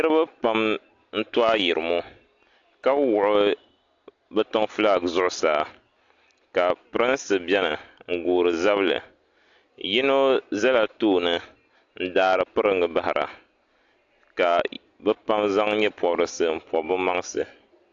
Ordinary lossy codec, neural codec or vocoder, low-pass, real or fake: AAC, 48 kbps; none; 7.2 kHz; real